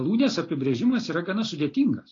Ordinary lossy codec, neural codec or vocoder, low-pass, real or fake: AAC, 32 kbps; none; 7.2 kHz; real